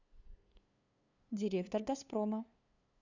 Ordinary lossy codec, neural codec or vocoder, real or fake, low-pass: none; codec, 16 kHz, 2 kbps, FunCodec, trained on LibriTTS, 25 frames a second; fake; 7.2 kHz